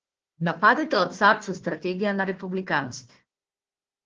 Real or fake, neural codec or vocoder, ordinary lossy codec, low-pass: fake; codec, 16 kHz, 1 kbps, FunCodec, trained on Chinese and English, 50 frames a second; Opus, 16 kbps; 7.2 kHz